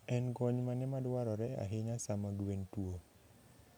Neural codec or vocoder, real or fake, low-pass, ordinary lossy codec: none; real; none; none